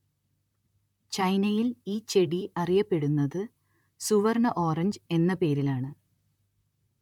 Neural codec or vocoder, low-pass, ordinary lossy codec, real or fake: vocoder, 44.1 kHz, 128 mel bands, Pupu-Vocoder; 19.8 kHz; MP3, 96 kbps; fake